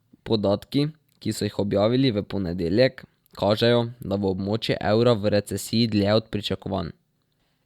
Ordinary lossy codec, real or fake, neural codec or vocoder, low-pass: Opus, 64 kbps; real; none; 19.8 kHz